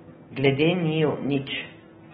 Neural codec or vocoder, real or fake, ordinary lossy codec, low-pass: none; real; AAC, 16 kbps; 19.8 kHz